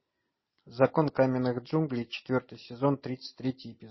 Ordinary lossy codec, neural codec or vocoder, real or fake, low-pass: MP3, 24 kbps; none; real; 7.2 kHz